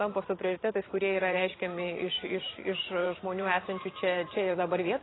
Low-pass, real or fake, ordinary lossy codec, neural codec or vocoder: 7.2 kHz; fake; AAC, 16 kbps; vocoder, 44.1 kHz, 128 mel bands every 512 samples, BigVGAN v2